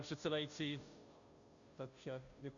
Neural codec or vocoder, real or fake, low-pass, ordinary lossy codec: codec, 16 kHz, 0.5 kbps, FunCodec, trained on Chinese and English, 25 frames a second; fake; 7.2 kHz; MP3, 96 kbps